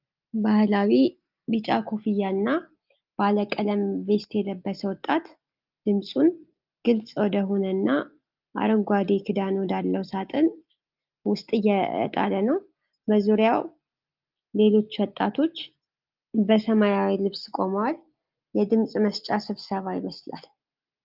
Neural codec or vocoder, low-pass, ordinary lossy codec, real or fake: none; 5.4 kHz; Opus, 32 kbps; real